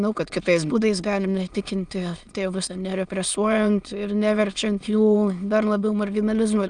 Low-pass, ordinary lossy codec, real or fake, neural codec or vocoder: 9.9 kHz; Opus, 32 kbps; fake; autoencoder, 22.05 kHz, a latent of 192 numbers a frame, VITS, trained on many speakers